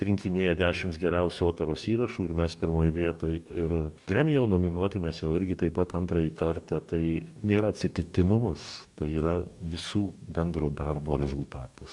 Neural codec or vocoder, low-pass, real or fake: codec, 44.1 kHz, 2.6 kbps, DAC; 10.8 kHz; fake